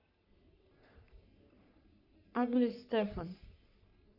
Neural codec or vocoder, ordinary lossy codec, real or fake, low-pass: codec, 44.1 kHz, 3.4 kbps, Pupu-Codec; none; fake; 5.4 kHz